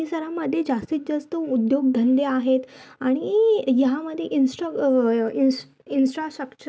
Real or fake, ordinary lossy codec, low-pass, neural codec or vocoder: real; none; none; none